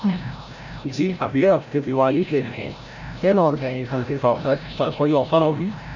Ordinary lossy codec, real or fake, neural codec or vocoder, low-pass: none; fake; codec, 16 kHz, 0.5 kbps, FreqCodec, larger model; 7.2 kHz